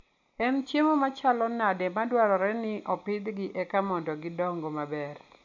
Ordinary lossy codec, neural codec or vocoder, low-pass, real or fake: MP3, 48 kbps; none; 7.2 kHz; real